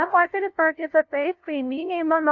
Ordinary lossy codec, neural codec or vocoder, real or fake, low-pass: AAC, 48 kbps; codec, 16 kHz, 0.5 kbps, FunCodec, trained on LibriTTS, 25 frames a second; fake; 7.2 kHz